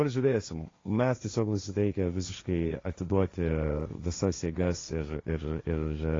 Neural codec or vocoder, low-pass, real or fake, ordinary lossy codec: codec, 16 kHz, 1.1 kbps, Voila-Tokenizer; 7.2 kHz; fake; AAC, 32 kbps